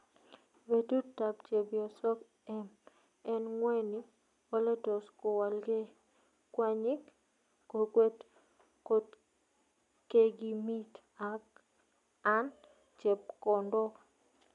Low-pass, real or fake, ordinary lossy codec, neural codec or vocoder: 10.8 kHz; real; none; none